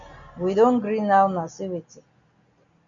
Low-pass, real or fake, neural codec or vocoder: 7.2 kHz; real; none